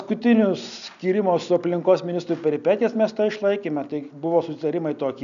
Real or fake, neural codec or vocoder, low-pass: real; none; 7.2 kHz